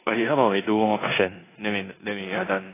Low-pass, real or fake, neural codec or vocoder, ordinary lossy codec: 3.6 kHz; fake; codec, 16 kHz in and 24 kHz out, 0.9 kbps, LongCat-Audio-Codec, four codebook decoder; AAC, 16 kbps